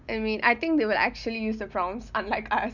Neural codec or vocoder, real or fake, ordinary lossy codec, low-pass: none; real; none; 7.2 kHz